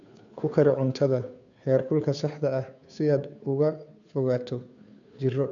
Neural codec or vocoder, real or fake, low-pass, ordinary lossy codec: codec, 16 kHz, 2 kbps, FunCodec, trained on Chinese and English, 25 frames a second; fake; 7.2 kHz; none